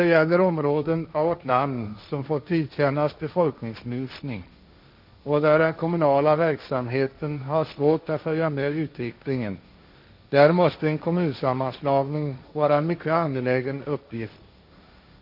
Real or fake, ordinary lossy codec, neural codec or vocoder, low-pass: fake; none; codec, 16 kHz, 1.1 kbps, Voila-Tokenizer; 5.4 kHz